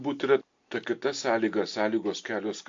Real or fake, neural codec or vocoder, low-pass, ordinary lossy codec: real; none; 7.2 kHz; AAC, 48 kbps